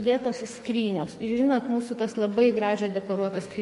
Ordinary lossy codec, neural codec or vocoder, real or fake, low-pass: MP3, 48 kbps; codec, 44.1 kHz, 2.6 kbps, SNAC; fake; 14.4 kHz